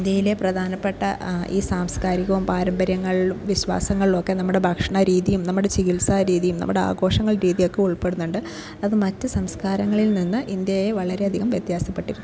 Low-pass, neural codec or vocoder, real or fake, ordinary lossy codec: none; none; real; none